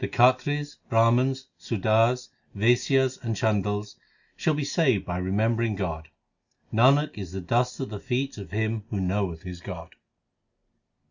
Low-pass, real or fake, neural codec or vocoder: 7.2 kHz; real; none